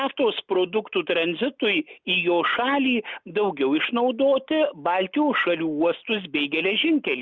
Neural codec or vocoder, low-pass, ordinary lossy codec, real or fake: none; 7.2 kHz; Opus, 64 kbps; real